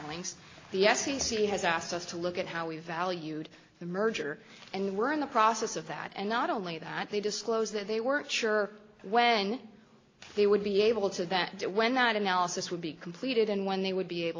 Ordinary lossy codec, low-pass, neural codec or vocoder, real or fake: AAC, 32 kbps; 7.2 kHz; none; real